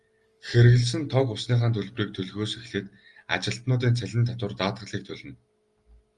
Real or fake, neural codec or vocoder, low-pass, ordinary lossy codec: real; none; 10.8 kHz; Opus, 32 kbps